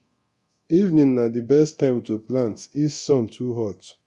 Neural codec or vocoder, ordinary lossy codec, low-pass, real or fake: codec, 24 kHz, 0.9 kbps, DualCodec; Opus, 64 kbps; 10.8 kHz; fake